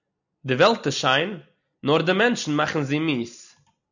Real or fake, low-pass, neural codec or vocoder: real; 7.2 kHz; none